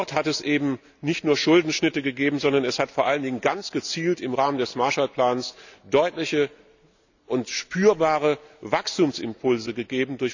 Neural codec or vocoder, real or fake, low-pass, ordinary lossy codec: none; real; 7.2 kHz; none